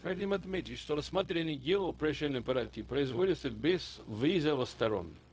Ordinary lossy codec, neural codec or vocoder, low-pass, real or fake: none; codec, 16 kHz, 0.4 kbps, LongCat-Audio-Codec; none; fake